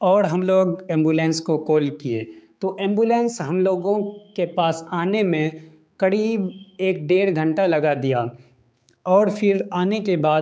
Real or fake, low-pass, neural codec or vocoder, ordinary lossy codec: fake; none; codec, 16 kHz, 4 kbps, X-Codec, HuBERT features, trained on balanced general audio; none